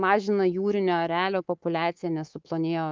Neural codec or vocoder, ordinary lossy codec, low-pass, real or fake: codec, 24 kHz, 3.1 kbps, DualCodec; Opus, 32 kbps; 7.2 kHz; fake